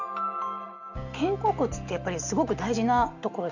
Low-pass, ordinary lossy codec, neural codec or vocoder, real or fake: 7.2 kHz; none; none; real